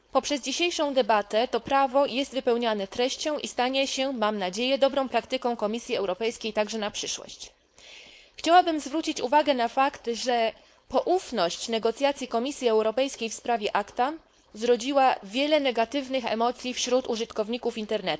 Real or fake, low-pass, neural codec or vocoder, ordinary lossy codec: fake; none; codec, 16 kHz, 4.8 kbps, FACodec; none